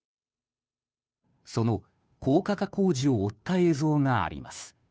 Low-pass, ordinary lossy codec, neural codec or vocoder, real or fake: none; none; codec, 16 kHz, 2 kbps, FunCodec, trained on Chinese and English, 25 frames a second; fake